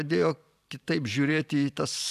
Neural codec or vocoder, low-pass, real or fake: vocoder, 44.1 kHz, 128 mel bands every 512 samples, BigVGAN v2; 14.4 kHz; fake